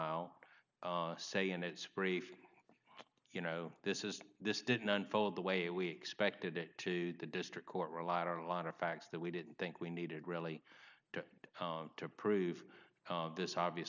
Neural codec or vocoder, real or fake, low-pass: none; real; 7.2 kHz